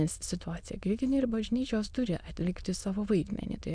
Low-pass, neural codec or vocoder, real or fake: 9.9 kHz; autoencoder, 22.05 kHz, a latent of 192 numbers a frame, VITS, trained on many speakers; fake